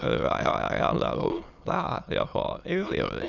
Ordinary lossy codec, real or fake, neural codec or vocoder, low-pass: none; fake; autoencoder, 22.05 kHz, a latent of 192 numbers a frame, VITS, trained on many speakers; 7.2 kHz